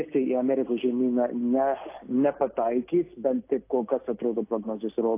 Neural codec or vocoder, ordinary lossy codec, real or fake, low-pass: none; AAC, 24 kbps; real; 3.6 kHz